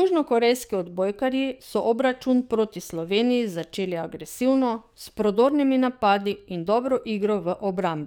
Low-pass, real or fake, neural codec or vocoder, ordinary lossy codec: 19.8 kHz; fake; codec, 44.1 kHz, 7.8 kbps, DAC; none